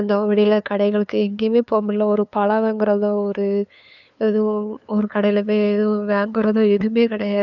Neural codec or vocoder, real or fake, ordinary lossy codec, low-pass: codec, 16 kHz, 2 kbps, FunCodec, trained on LibriTTS, 25 frames a second; fake; none; 7.2 kHz